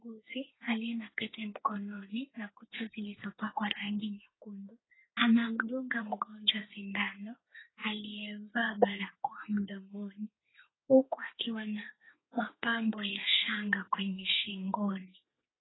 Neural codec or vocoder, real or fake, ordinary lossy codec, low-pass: codec, 32 kHz, 1.9 kbps, SNAC; fake; AAC, 16 kbps; 7.2 kHz